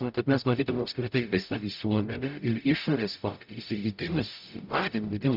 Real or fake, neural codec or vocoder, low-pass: fake; codec, 44.1 kHz, 0.9 kbps, DAC; 5.4 kHz